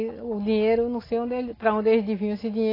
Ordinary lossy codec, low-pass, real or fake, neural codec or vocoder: AAC, 24 kbps; 5.4 kHz; real; none